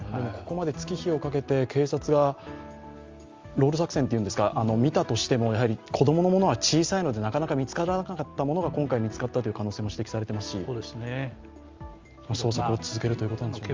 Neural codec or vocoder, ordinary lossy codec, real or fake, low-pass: none; Opus, 32 kbps; real; 7.2 kHz